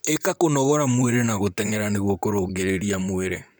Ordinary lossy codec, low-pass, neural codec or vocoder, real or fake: none; none; vocoder, 44.1 kHz, 128 mel bands, Pupu-Vocoder; fake